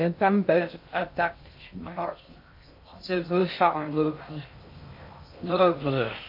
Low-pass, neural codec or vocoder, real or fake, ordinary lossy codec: 5.4 kHz; codec, 16 kHz in and 24 kHz out, 0.6 kbps, FocalCodec, streaming, 2048 codes; fake; MP3, 32 kbps